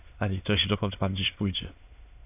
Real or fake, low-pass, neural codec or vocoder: fake; 3.6 kHz; autoencoder, 22.05 kHz, a latent of 192 numbers a frame, VITS, trained on many speakers